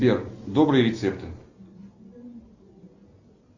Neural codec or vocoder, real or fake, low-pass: none; real; 7.2 kHz